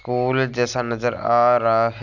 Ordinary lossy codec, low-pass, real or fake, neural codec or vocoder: none; 7.2 kHz; real; none